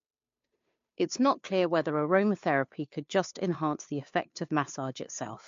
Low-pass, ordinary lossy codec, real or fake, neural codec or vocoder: 7.2 kHz; MP3, 48 kbps; fake; codec, 16 kHz, 2 kbps, FunCodec, trained on Chinese and English, 25 frames a second